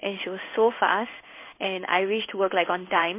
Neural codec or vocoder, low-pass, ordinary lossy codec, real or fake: codec, 16 kHz in and 24 kHz out, 1 kbps, XY-Tokenizer; 3.6 kHz; MP3, 24 kbps; fake